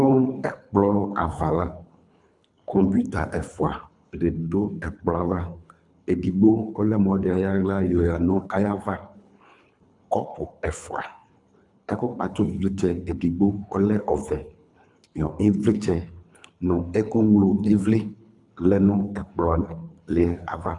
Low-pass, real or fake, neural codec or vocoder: 10.8 kHz; fake; codec, 24 kHz, 3 kbps, HILCodec